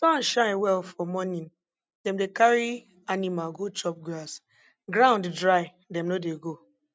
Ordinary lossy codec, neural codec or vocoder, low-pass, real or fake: none; none; none; real